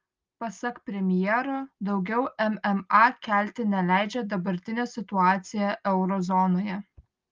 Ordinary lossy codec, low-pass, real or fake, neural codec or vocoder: Opus, 24 kbps; 7.2 kHz; real; none